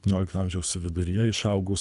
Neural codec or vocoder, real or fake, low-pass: codec, 24 kHz, 3 kbps, HILCodec; fake; 10.8 kHz